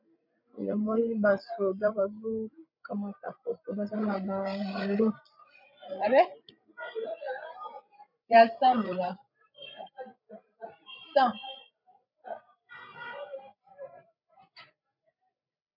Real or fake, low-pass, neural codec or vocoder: fake; 5.4 kHz; codec, 16 kHz, 16 kbps, FreqCodec, larger model